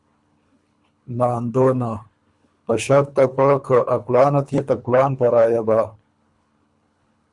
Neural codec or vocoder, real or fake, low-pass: codec, 24 kHz, 3 kbps, HILCodec; fake; 10.8 kHz